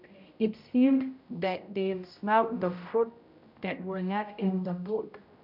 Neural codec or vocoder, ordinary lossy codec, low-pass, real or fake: codec, 16 kHz, 0.5 kbps, X-Codec, HuBERT features, trained on general audio; none; 5.4 kHz; fake